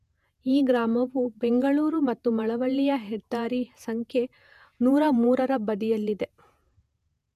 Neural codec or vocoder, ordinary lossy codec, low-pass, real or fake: vocoder, 48 kHz, 128 mel bands, Vocos; none; 14.4 kHz; fake